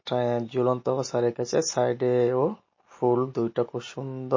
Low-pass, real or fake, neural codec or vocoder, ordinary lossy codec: 7.2 kHz; real; none; MP3, 32 kbps